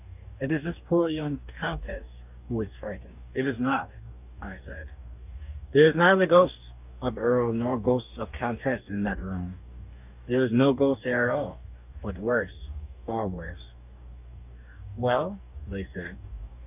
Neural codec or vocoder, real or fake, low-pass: codec, 44.1 kHz, 2.6 kbps, DAC; fake; 3.6 kHz